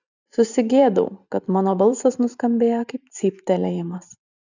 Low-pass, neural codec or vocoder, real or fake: 7.2 kHz; vocoder, 44.1 kHz, 128 mel bands every 512 samples, BigVGAN v2; fake